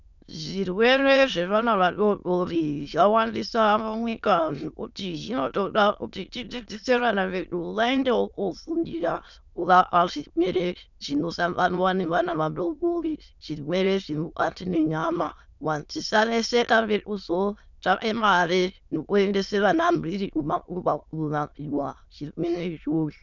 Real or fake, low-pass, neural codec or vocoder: fake; 7.2 kHz; autoencoder, 22.05 kHz, a latent of 192 numbers a frame, VITS, trained on many speakers